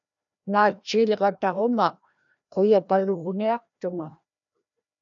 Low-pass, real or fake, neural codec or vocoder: 7.2 kHz; fake; codec, 16 kHz, 1 kbps, FreqCodec, larger model